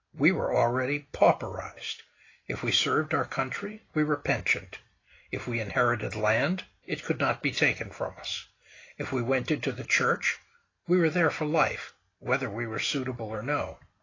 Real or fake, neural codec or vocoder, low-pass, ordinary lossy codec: real; none; 7.2 kHz; AAC, 32 kbps